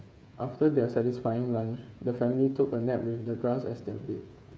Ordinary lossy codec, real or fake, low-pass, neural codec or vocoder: none; fake; none; codec, 16 kHz, 8 kbps, FreqCodec, smaller model